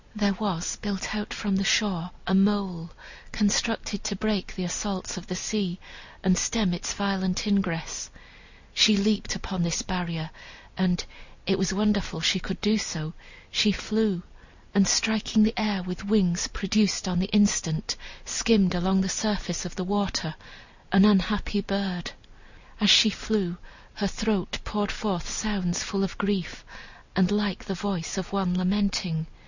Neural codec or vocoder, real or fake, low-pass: none; real; 7.2 kHz